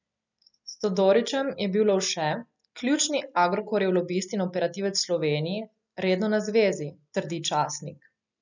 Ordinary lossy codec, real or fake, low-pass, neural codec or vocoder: none; real; 7.2 kHz; none